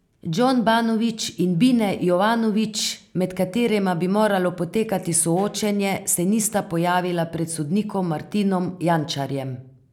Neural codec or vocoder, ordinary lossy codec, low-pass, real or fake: none; none; 19.8 kHz; real